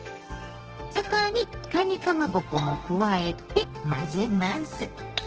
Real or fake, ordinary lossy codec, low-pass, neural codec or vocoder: fake; Opus, 16 kbps; 7.2 kHz; codec, 32 kHz, 1.9 kbps, SNAC